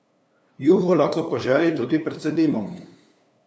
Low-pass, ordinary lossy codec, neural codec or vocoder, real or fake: none; none; codec, 16 kHz, 2 kbps, FunCodec, trained on LibriTTS, 25 frames a second; fake